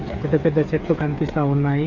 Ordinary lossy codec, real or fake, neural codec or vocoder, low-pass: Opus, 64 kbps; fake; codec, 16 kHz, 8 kbps, FreqCodec, smaller model; 7.2 kHz